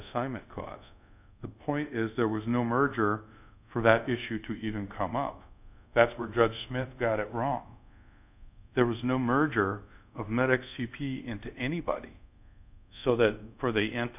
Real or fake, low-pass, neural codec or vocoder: fake; 3.6 kHz; codec, 24 kHz, 0.5 kbps, DualCodec